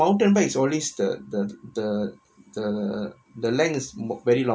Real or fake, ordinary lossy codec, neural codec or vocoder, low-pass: real; none; none; none